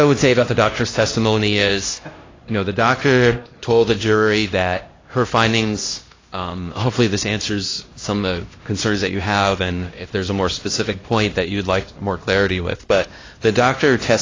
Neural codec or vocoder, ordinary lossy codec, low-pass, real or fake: codec, 16 kHz, 1 kbps, X-Codec, HuBERT features, trained on LibriSpeech; AAC, 32 kbps; 7.2 kHz; fake